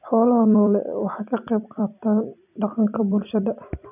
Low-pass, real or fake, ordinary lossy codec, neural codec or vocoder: 3.6 kHz; fake; none; vocoder, 44.1 kHz, 128 mel bands every 256 samples, BigVGAN v2